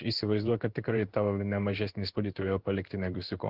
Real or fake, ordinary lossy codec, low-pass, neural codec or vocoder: fake; Opus, 16 kbps; 5.4 kHz; codec, 16 kHz in and 24 kHz out, 1 kbps, XY-Tokenizer